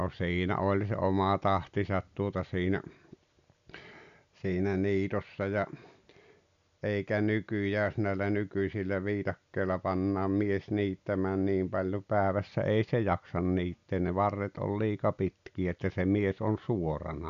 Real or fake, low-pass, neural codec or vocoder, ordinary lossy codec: real; 7.2 kHz; none; none